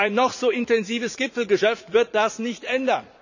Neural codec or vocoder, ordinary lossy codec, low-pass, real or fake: vocoder, 44.1 kHz, 80 mel bands, Vocos; none; 7.2 kHz; fake